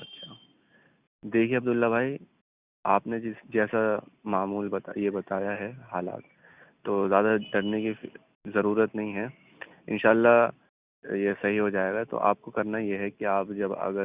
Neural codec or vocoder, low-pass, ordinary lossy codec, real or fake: none; 3.6 kHz; Opus, 64 kbps; real